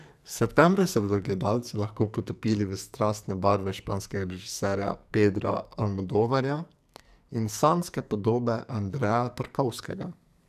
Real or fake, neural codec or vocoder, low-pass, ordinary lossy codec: fake; codec, 44.1 kHz, 2.6 kbps, SNAC; 14.4 kHz; none